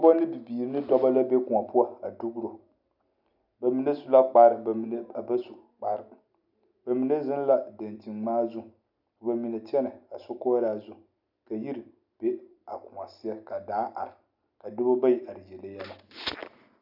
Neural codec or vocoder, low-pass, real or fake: none; 5.4 kHz; real